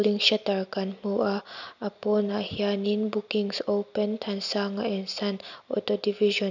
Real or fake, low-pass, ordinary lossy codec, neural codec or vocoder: real; 7.2 kHz; none; none